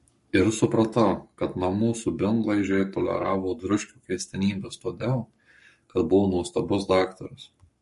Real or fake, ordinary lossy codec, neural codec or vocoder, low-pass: fake; MP3, 48 kbps; codec, 44.1 kHz, 7.8 kbps, Pupu-Codec; 14.4 kHz